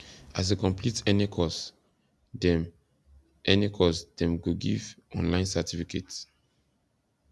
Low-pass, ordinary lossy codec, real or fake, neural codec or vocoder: none; none; real; none